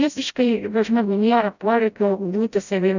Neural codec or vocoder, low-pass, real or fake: codec, 16 kHz, 0.5 kbps, FreqCodec, smaller model; 7.2 kHz; fake